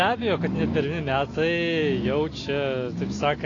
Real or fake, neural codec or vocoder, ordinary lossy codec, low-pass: real; none; AAC, 32 kbps; 7.2 kHz